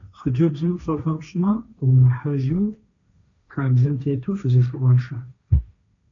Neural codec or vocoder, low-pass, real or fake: codec, 16 kHz, 1.1 kbps, Voila-Tokenizer; 7.2 kHz; fake